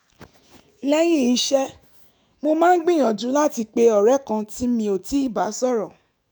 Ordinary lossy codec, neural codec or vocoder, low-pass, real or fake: none; autoencoder, 48 kHz, 128 numbers a frame, DAC-VAE, trained on Japanese speech; none; fake